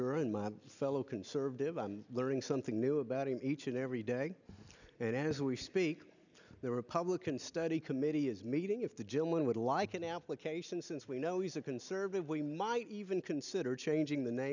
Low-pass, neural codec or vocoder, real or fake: 7.2 kHz; none; real